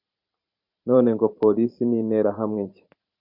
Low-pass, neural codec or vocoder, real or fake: 5.4 kHz; vocoder, 44.1 kHz, 128 mel bands every 512 samples, BigVGAN v2; fake